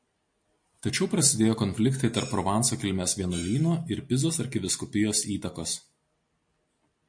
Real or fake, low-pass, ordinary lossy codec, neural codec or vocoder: real; 9.9 kHz; MP3, 48 kbps; none